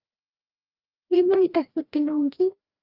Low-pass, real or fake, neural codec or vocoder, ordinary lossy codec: 5.4 kHz; fake; codec, 16 kHz, 1 kbps, FreqCodec, larger model; Opus, 24 kbps